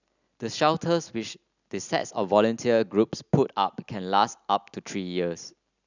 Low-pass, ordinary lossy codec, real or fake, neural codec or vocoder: 7.2 kHz; none; real; none